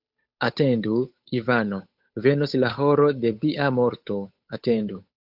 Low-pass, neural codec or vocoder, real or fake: 5.4 kHz; codec, 16 kHz, 8 kbps, FunCodec, trained on Chinese and English, 25 frames a second; fake